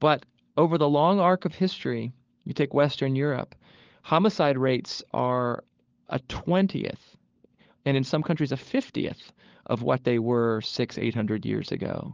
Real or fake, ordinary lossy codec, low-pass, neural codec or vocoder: fake; Opus, 32 kbps; 7.2 kHz; codec, 16 kHz, 16 kbps, FunCodec, trained on LibriTTS, 50 frames a second